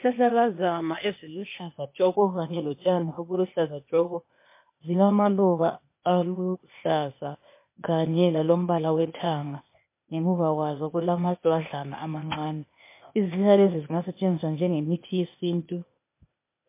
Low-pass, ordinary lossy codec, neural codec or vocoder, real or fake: 3.6 kHz; MP3, 24 kbps; codec, 16 kHz, 0.8 kbps, ZipCodec; fake